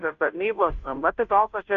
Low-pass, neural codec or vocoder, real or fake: 7.2 kHz; codec, 16 kHz, 0.5 kbps, FunCodec, trained on Chinese and English, 25 frames a second; fake